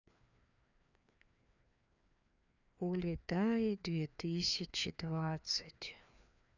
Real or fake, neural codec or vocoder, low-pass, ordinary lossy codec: fake; codec, 16 kHz, 2 kbps, FreqCodec, larger model; 7.2 kHz; none